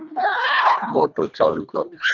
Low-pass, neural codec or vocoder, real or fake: 7.2 kHz; codec, 24 kHz, 1.5 kbps, HILCodec; fake